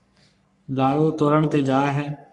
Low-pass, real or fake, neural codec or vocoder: 10.8 kHz; fake; codec, 44.1 kHz, 3.4 kbps, Pupu-Codec